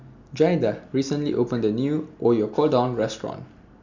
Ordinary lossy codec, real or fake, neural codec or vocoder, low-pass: none; real; none; 7.2 kHz